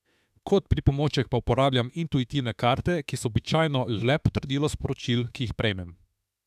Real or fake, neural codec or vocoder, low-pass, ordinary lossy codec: fake; autoencoder, 48 kHz, 32 numbers a frame, DAC-VAE, trained on Japanese speech; 14.4 kHz; none